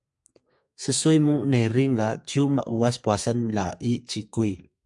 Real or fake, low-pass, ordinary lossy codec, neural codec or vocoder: fake; 10.8 kHz; MP3, 64 kbps; codec, 32 kHz, 1.9 kbps, SNAC